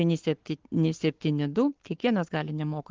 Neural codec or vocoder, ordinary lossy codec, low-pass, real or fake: codec, 44.1 kHz, 7.8 kbps, Pupu-Codec; Opus, 16 kbps; 7.2 kHz; fake